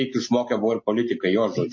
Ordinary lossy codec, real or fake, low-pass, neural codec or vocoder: MP3, 32 kbps; real; 7.2 kHz; none